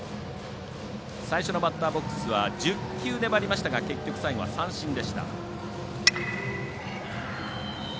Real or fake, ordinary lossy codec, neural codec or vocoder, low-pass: real; none; none; none